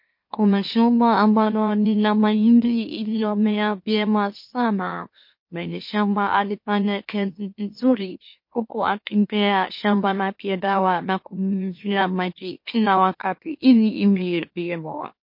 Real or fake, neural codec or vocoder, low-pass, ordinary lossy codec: fake; autoencoder, 44.1 kHz, a latent of 192 numbers a frame, MeloTTS; 5.4 kHz; MP3, 32 kbps